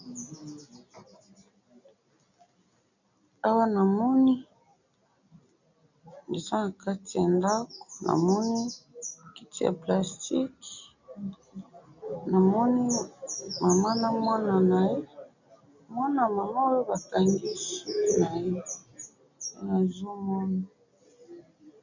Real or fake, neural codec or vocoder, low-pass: real; none; 7.2 kHz